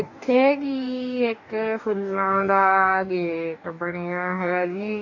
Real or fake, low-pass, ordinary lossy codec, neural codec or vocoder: fake; 7.2 kHz; none; codec, 44.1 kHz, 2.6 kbps, DAC